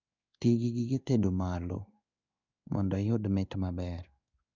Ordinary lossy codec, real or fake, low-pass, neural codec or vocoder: none; fake; 7.2 kHz; codec, 16 kHz in and 24 kHz out, 1 kbps, XY-Tokenizer